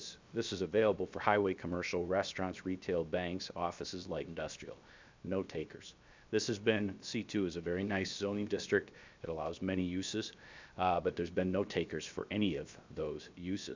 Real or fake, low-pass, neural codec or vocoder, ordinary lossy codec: fake; 7.2 kHz; codec, 16 kHz, 0.7 kbps, FocalCodec; MP3, 64 kbps